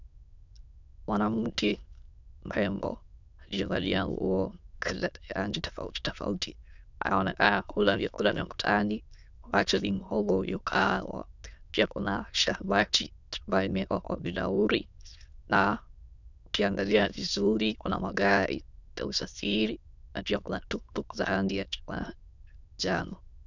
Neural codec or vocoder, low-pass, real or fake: autoencoder, 22.05 kHz, a latent of 192 numbers a frame, VITS, trained on many speakers; 7.2 kHz; fake